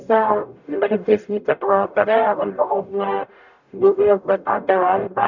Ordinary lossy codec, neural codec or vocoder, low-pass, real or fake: none; codec, 44.1 kHz, 0.9 kbps, DAC; 7.2 kHz; fake